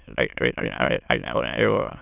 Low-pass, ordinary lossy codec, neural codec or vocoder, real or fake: 3.6 kHz; none; autoencoder, 22.05 kHz, a latent of 192 numbers a frame, VITS, trained on many speakers; fake